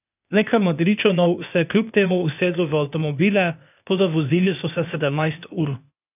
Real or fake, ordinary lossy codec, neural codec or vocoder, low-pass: fake; none; codec, 16 kHz, 0.8 kbps, ZipCodec; 3.6 kHz